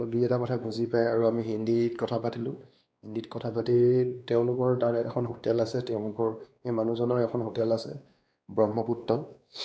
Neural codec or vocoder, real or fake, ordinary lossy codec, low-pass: codec, 16 kHz, 2 kbps, X-Codec, WavLM features, trained on Multilingual LibriSpeech; fake; none; none